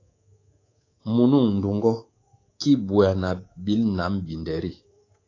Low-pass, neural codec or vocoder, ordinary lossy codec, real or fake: 7.2 kHz; codec, 24 kHz, 3.1 kbps, DualCodec; AAC, 32 kbps; fake